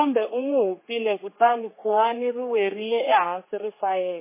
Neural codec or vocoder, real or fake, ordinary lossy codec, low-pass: codec, 16 kHz, 4 kbps, X-Codec, HuBERT features, trained on general audio; fake; MP3, 16 kbps; 3.6 kHz